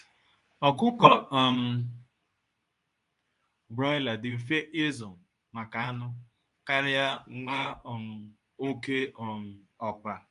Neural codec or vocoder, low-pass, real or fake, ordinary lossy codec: codec, 24 kHz, 0.9 kbps, WavTokenizer, medium speech release version 2; 10.8 kHz; fake; none